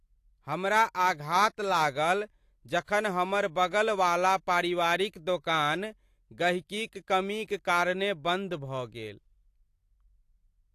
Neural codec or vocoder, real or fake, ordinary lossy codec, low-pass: none; real; AAC, 64 kbps; 14.4 kHz